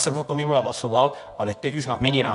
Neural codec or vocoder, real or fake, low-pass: codec, 24 kHz, 0.9 kbps, WavTokenizer, medium music audio release; fake; 10.8 kHz